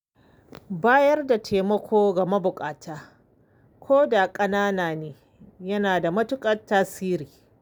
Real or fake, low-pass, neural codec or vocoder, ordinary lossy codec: real; none; none; none